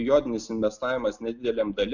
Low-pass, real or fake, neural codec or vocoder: 7.2 kHz; real; none